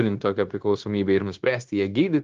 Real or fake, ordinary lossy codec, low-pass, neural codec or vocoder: fake; Opus, 16 kbps; 7.2 kHz; codec, 16 kHz, 0.9 kbps, LongCat-Audio-Codec